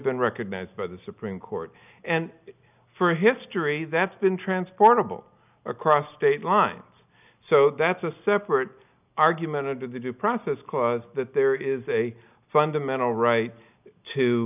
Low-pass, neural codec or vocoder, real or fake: 3.6 kHz; none; real